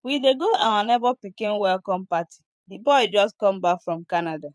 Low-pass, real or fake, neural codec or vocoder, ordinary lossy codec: none; fake; vocoder, 22.05 kHz, 80 mel bands, Vocos; none